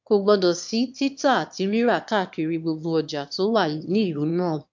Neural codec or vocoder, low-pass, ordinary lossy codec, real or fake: autoencoder, 22.05 kHz, a latent of 192 numbers a frame, VITS, trained on one speaker; 7.2 kHz; MP3, 64 kbps; fake